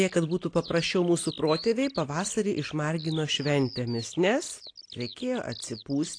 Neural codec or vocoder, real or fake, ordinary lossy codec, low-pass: none; real; AAC, 48 kbps; 9.9 kHz